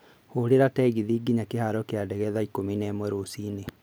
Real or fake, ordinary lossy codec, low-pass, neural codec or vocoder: real; none; none; none